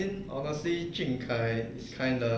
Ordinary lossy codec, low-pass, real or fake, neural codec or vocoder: none; none; real; none